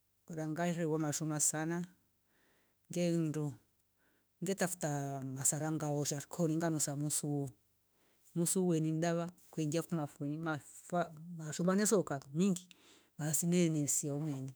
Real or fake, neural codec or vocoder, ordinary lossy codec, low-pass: fake; autoencoder, 48 kHz, 32 numbers a frame, DAC-VAE, trained on Japanese speech; none; none